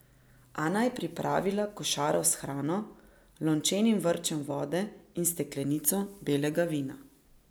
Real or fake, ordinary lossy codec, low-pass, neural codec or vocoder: real; none; none; none